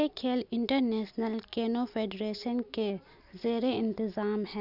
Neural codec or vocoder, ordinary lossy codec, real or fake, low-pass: none; none; real; 5.4 kHz